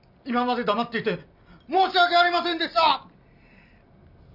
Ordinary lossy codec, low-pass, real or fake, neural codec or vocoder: none; 5.4 kHz; real; none